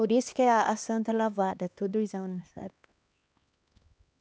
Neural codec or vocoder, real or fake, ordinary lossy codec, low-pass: codec, 16 kHz, 2 kbps, X-Codec, HuBERT features, trained on LibriSpeech; fake; none; none